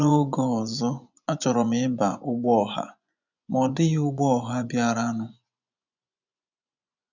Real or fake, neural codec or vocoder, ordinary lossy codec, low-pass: fake; vocoder, 24 kHz, 100 mel bands, Vocos; none; 7.2 kHz